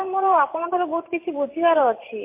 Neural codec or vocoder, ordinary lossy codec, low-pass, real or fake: vocoder, 44.1 kHz, 128 mel bands every 512 samples, BigVGAN v2; MP3, 24 kbps; 3.6 kHz; fake